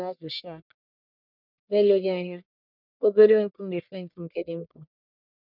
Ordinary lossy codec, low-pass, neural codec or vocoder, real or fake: none; 5.4 kHz; codec, 44.1 kHz, 1.7 kbps, Pupu-Codec; fake